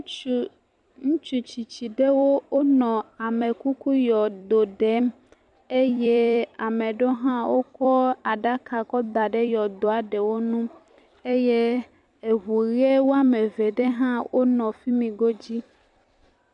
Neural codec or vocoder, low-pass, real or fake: vocoder, 44.1 kHz, 128 mel bands every 256 samples, BigVGAN v2; 10.8 kHz; fake